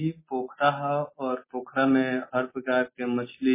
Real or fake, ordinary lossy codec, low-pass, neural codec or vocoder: real; MP3, 16 kbps; 3.6 kHz; none